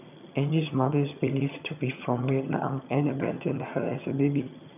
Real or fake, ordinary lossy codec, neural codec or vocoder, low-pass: fake; none; vocoder, 22.05 kHz, 80 mel bands, HiFi-GAN; 3.6 kHz